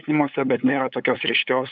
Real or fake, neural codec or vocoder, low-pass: fake; codec, 16 kHz, 8 kbps, FunCodec, trained on LibriTTS, 25 frames a second; 7.2 kHz